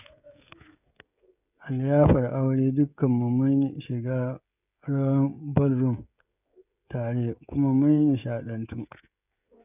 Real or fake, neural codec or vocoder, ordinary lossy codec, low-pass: fake; codec, 16 kHz, 16 kbps, FreqCodec, smaller model; none; 3.6 kHz